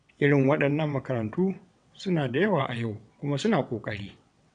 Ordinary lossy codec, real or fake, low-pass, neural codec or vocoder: none; fake; 9.9 kHz; vocoder, 22.05 kHz, 80 mel bands, WaveNeXt